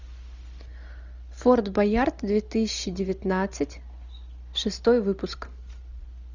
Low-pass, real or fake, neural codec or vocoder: 7.2 kHz; real; none